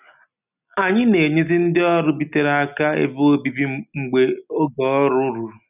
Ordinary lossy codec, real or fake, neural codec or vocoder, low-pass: none; real; none; 3.6 kHz